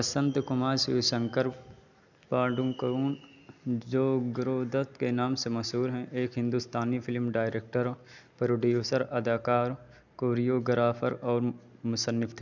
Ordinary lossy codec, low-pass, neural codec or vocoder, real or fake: none; 7.2 kHz; none; real